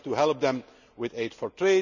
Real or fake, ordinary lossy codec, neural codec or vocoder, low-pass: real; none; none; 7.2 kHz